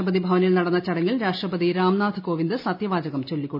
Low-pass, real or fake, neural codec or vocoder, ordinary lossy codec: 5.4 kHz; real; none; AAC, 48 kbps